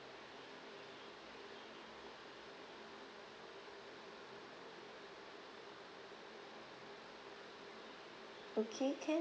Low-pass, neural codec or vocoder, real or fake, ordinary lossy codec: none; none; real; none